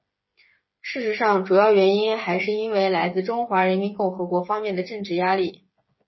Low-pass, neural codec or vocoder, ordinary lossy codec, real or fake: 7.2 kHz; codec, 16 kHz, 8 kbps, FreqCodec, smaller model; MP3, 24 kbps; fake